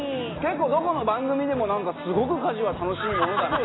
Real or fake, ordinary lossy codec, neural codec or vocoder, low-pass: real; AAC, 16 kbps; none; 7.2 kHz